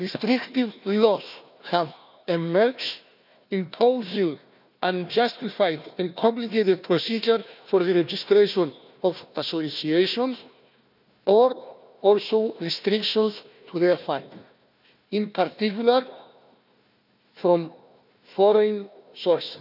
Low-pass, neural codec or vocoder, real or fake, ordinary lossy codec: 5.4 kHz; codec, 16 kHz, 1 kbps, FunCodec, trained on Chinese and English, 50 frames a second; fake; none